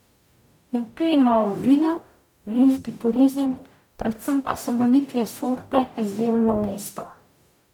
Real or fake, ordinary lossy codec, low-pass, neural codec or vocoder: fake; none; 19.8 kHz; codec, 44.1 kHz, 0.9 kbps, DAC